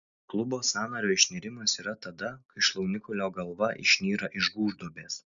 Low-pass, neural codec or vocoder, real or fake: 7.2 kHz; none; real